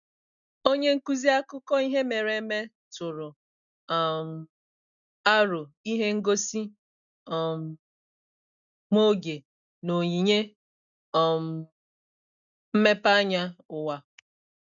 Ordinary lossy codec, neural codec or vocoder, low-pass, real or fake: none; none; 7.2 kHz; real